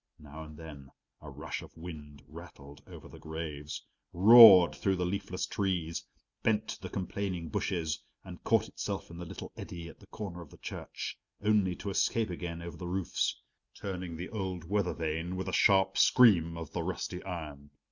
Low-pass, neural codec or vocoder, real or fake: 7.2 kHz; none; real